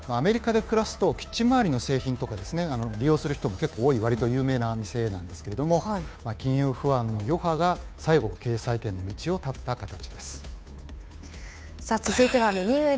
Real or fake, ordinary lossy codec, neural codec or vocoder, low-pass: fake; none; codec, 16 kHz, 2 kbps, FunCodec, trained on Chinese and English, 25 frames a second; none